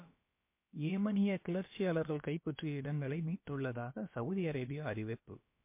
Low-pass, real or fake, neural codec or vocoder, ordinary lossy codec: 3.6 kHz; fake; codec, 16 kHz, about 1 kbps, DyCAST, with the encoder's durations; MP3, 24 kbps